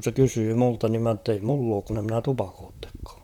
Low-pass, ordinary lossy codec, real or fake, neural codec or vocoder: 19.8 kHz; none; fake; vocoder, 44.1 kHz, 128 mel bands, Pupu-Vocoder